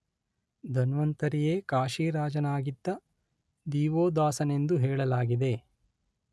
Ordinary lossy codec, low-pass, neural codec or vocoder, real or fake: none; none; none; real